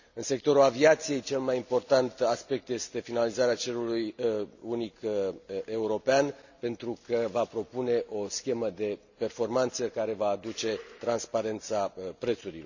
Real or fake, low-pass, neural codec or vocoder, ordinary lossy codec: real; 7.2 kHz; none; none